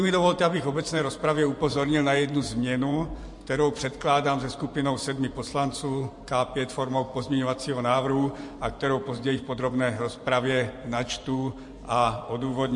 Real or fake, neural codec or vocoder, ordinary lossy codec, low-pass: fake; vocoder, 44.1 kHz, 128 mel bands every 512 samples, BigVGAN v2; MP3, 48 kbps; 10.8 kHz